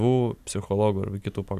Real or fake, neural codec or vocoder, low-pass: real; none; 14.4 kHz